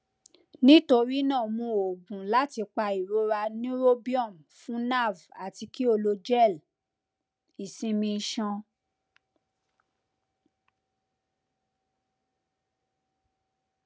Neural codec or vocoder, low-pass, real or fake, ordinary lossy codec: none; none; real; none